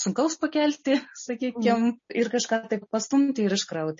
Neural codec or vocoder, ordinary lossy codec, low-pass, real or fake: none; MP3, 32 kbps; 7.2 kHz; real